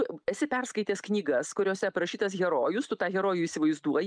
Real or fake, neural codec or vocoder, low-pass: real; none; 9.9 kHz